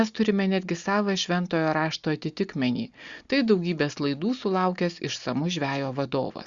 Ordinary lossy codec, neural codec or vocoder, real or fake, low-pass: Opus, 64 kbps; none; real; 7.2 kHz